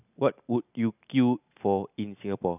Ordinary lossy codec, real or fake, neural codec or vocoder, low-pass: none; real; none; 3.6 kHz